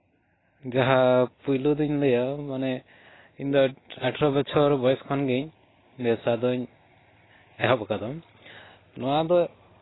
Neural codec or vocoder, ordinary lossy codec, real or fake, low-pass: none; AAC, 16 kbps; real; 7.2 kHz